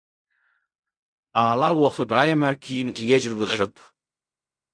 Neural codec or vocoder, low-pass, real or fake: codec, 16 kHz in and 24 kHz out, 0.4 kbps, LongCat-Audio-Codec, fine tuned four codebook decoder; 9.9 kHz; fake